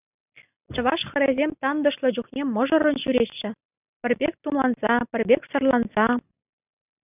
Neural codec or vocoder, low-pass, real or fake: none; 3.6 kHz; real